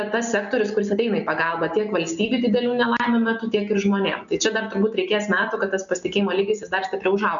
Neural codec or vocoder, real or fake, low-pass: none; real; 7.2 kHz